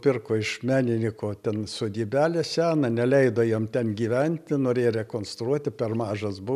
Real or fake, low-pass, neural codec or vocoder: real; 14.4 kHz; none